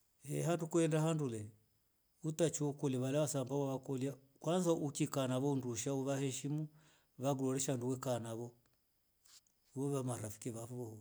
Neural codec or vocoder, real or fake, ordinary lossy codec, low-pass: none; real; none; none